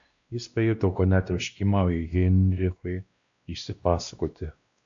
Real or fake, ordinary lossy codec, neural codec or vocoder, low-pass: fake; AAC, 64 kbps; codec, 16 kHz, 1 kbps, X-Codec, WavLM features, trained on Multilingual LibriSpeech; 7.2 kHz